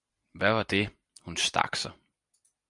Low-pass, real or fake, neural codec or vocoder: 10.8 kHz; real; none